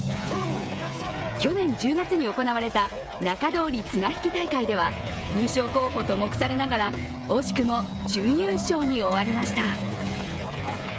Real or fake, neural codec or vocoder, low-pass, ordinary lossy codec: fake; codec, 16 kHz, 8 kbps, FreqCodec, smaller model; none; none